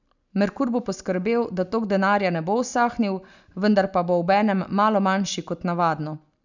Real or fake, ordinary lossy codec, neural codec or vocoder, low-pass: real; none; none; 7.2 kHz